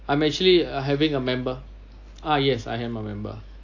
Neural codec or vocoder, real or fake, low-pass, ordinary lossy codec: none; real; 7.2 kHz; AAC, 48 kbps